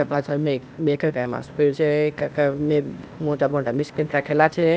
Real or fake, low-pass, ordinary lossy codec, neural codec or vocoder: fake; none; none; codec, 16 kHz, 0.8 kbps, ZipCodec